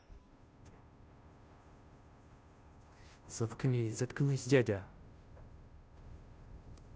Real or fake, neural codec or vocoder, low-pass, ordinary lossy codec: fake; codec, 16 kHz, 0.5 kbps, FunCodec, trained on Chinese and English, 25 frames a second; none; none